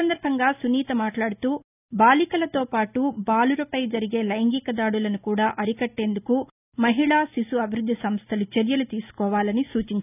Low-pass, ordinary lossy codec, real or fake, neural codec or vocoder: 3.6 kHz; none; real; none